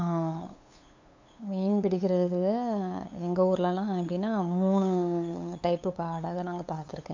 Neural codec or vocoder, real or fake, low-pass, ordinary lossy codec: codec, 16 kHz, 8 kbps, FunCodec, trained on LibriTTS, 25 frames a second; fake; 7.2 kHz; MP3, 48 kbps